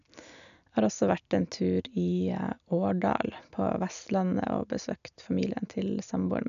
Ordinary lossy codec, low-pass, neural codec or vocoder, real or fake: none; 7.2 kHz; none; real